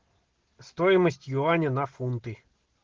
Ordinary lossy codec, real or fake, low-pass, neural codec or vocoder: Opus, 16 kbps; real; 7.2 kHz; none